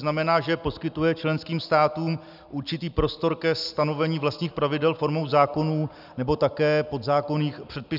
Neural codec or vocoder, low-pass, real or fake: none; 5.4 kHz; real